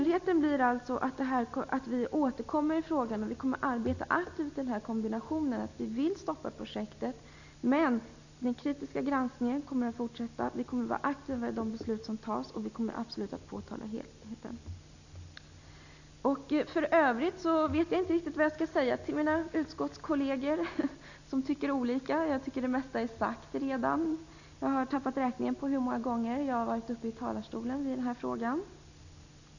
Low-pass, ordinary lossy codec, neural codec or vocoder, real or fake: 7.2 kHz; none; none; real